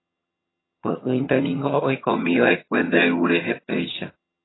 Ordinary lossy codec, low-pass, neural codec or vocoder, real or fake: AAC, 16 kbps; 7.2 kHz; vocoder, 22.05 kHz, 80 mel bands, HiFi-GAN; fake